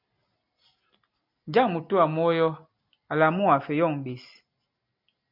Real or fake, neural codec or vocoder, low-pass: real; none; 5.4 kHz